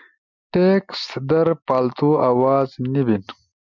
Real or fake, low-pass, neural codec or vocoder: real; 7.2 kHz; none